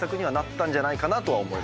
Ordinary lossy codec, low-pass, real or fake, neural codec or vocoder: none; none; real; none